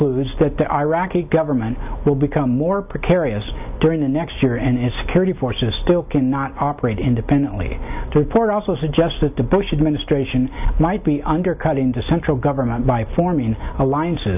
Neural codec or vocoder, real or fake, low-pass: none; real; 3.6 kHz